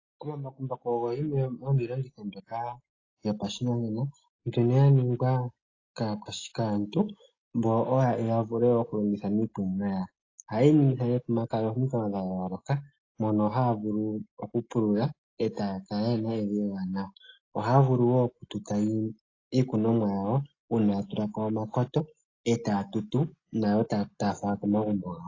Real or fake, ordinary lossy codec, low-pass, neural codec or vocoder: real; AAC, 32 kbps; 7.2 kHz; none